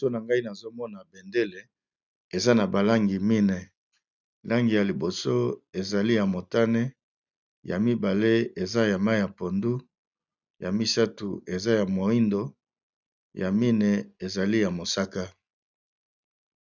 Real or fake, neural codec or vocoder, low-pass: real; none; 7.2 kHz